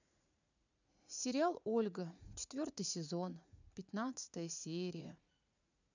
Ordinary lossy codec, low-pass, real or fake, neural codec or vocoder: none; 7.2 kHz; fake; vocoder, 44.1 kHz, 80 mel bands, Vocos